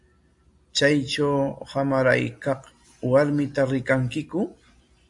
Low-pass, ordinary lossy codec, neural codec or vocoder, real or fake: 10.8 kHz; AAC, 64 kbps; none; real